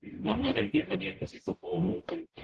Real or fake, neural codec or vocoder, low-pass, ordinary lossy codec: fake; codec, 44.1 kHz, 0.9 kbps, DAC; 10.8 kHz; Opus, 16 kbps